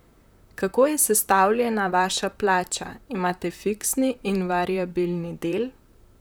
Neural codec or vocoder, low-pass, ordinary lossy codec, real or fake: vocoder, 44.1 kHz, 128 mel bands, Pupu-Vocoder; none; none; fake